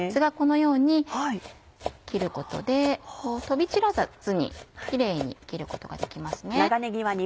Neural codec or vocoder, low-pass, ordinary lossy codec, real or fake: none; none; none; real